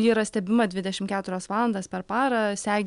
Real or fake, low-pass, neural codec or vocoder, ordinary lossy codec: real; 10.8 kHz; none; MP3, 96 kbps